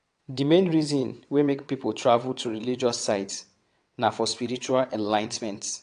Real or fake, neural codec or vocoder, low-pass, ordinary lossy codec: fake; vocoder, 22.05 kHz, 80 mel bands, WaveNeXt; 9.9 kHz; AAC, 64 kbps